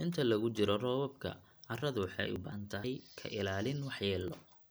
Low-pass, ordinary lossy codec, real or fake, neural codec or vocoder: none; none; real; none